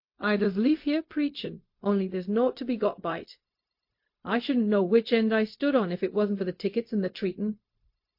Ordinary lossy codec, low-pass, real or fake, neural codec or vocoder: MP3, 32 kbps; 5.4 kHz; fake; codec, 16 kHz, 0.4 kbps, LongCat-Audio-Codec